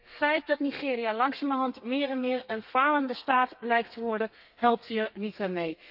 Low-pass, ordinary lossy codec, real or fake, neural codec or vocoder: 5.4 kHz; none; fake; codec, 32 kHz, 1.9 kbps, SNAC